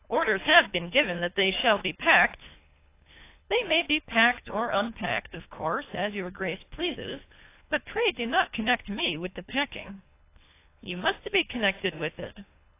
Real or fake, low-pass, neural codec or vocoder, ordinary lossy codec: fake; 3.6 kHz; codec, 24 kHz, 3 kbps, HILCodec; AAC, 24 kbps